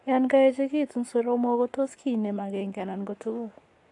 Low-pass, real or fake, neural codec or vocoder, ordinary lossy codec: 10.8 kHz; fake; codec, 44.1 kHz, 7.8 kbps, Pupu-Codec; AAC, 48 kbps